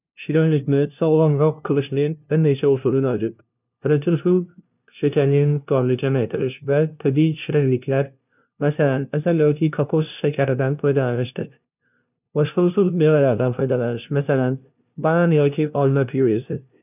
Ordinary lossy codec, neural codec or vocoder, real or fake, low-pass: none; codec, 16 kHz, 0.5 kbps, FunCodec, trained on LibriTTS, 25 frames a second; fake; 3.6 kHz